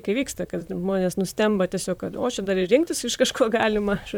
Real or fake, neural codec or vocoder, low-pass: fake; vocoder, 44.1 kHz, 128 mel bands, Pupu-Vocoder; 19.8 kHz